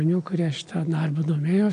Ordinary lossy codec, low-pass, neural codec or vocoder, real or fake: AAC, 48 kbps; 9.9 kHz; none; real